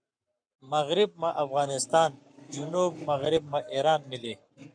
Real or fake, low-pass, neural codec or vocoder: fake; 9.9 kHz; codec, 44.1 kHz, 7.8 kbps, Pupu-Codec